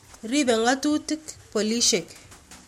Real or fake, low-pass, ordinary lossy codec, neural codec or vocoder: real; 19.8 kHz; MP3, 64 kbps; none